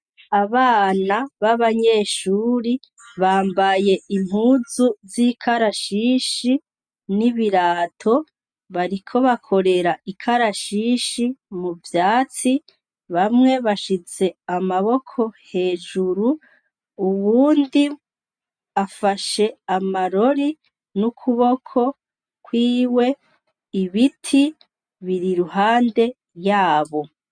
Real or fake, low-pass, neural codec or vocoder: real; 9.9 kHz; none